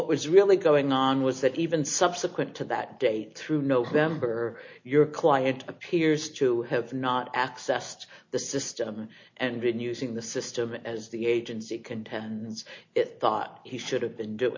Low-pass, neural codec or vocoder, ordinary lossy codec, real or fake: 7.2 kHz; none; MP3, 48 kbps; real